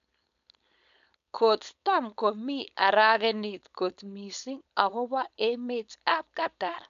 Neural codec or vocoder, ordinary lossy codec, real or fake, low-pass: codec, 16 kHz, 4.8 kbps, FACodec; none; fake; 7.2 kHz